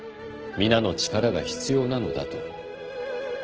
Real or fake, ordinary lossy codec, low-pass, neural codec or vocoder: real; Opus, 16 kbps; 7.2 kHz; none